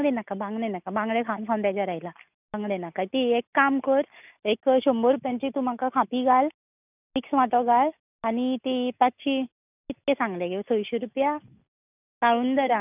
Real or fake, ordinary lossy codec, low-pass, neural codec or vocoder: real; none; 3.6 kHz; none